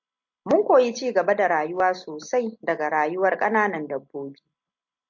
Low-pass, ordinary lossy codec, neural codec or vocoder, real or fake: 7.2 kHz; MP3, 48 kbps; none; real